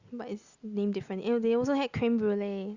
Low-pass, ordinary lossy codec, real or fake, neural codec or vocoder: 7.2 kHz; Opus, 64 kbps; real; none